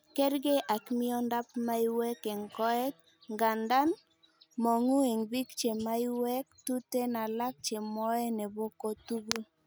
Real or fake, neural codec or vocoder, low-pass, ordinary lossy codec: real; none; none; none